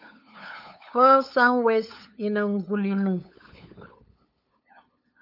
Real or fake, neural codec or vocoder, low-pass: fake; codec, 16 kHz, 8 kbps, FunCodec, trained on LibriTTS, 25 frames a second; 5.4 kHz